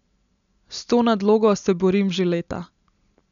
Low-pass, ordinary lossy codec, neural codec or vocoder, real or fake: 7.2 kHz; none; none; real